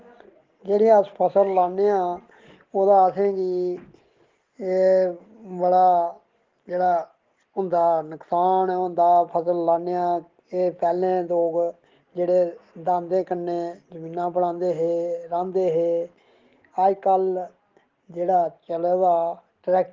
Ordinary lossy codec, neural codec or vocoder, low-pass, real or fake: Opus, 16 kbps; none; 7.2 kHz; real